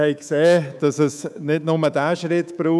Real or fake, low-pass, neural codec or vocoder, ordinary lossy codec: fake; none; codec, 24 kHz, 3.1 kbps, DualCodec; none